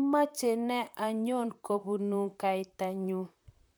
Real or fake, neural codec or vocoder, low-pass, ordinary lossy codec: fake; vocoder, 44.1 kHz, 128 mel bands, Pupu-Vocoder; none; none